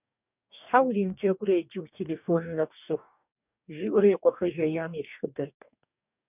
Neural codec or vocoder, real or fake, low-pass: codec, 44.1 kHz, 2.6 kbps, DAC; fake; 3.6 kHz